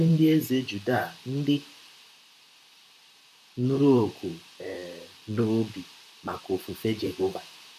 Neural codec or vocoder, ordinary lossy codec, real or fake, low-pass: vocoder, 44.1 kHz, 128 mel bands, Pupu-Vocoder; none; fake; 14.4 kHz